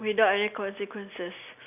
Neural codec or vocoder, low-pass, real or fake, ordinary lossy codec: none; 3.6 kHz; real; none